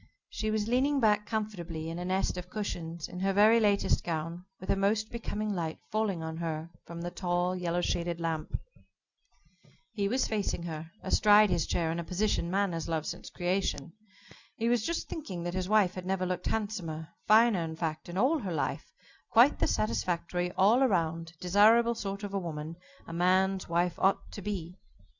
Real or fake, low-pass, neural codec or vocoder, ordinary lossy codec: real; 7.2 kHz; none; Opus, 64 kbps